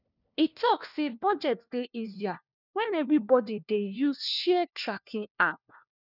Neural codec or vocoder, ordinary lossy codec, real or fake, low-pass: codec, 16 kHz, 1 kbps, FunCodec, trained on LibriTTS, 50 frames a second; none; fake; 5.4 kHz